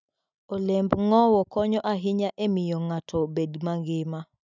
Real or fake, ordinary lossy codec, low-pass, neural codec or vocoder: real; none; 7.2 kHz; none